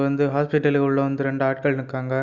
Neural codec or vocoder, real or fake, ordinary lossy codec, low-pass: none; real; none; 7.2 kHz